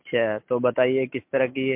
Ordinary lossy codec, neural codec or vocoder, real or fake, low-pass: MP3, 32 kbps; none; real; 3.6 kHz